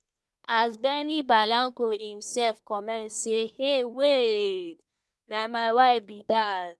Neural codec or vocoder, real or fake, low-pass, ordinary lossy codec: codec, 24 kHz, 1 kbps, SNAC; fake; none; none